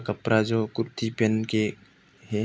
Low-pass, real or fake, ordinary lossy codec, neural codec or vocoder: none; real; none; none